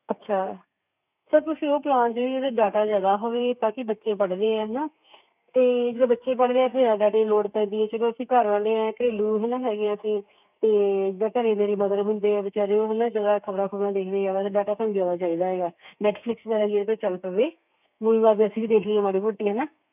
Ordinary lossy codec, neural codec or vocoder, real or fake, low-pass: none; codec, 32 kHz, 1.9 kbps, SNAC; fake; 3.6 kHz